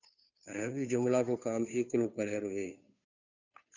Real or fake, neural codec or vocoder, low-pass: fake; codec, 16 kHz, 2 kbps, FunCodec, trained on Chinese and English, 25 frames a second; 7.2 kHz